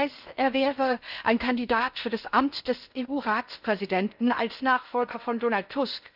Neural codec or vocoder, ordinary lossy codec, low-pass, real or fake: codec, 16 kHz in and 24 kHz out, 0.6 kbps, FocalCodec, streaming, 4096 codes; none; 5.4 kHz; fake